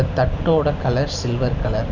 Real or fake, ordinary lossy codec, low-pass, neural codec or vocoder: real; none; 7.2 kHz; none